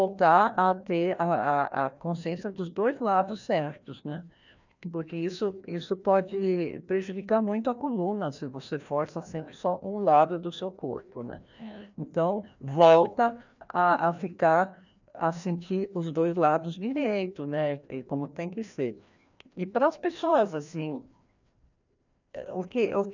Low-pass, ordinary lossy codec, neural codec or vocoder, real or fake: 7.2 kHz; none; codec, 16 kHz, 1 kbps, FreqCodec, larger model; fake